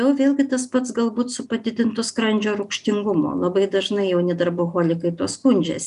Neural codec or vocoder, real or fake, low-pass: none; real; 10.8 kHz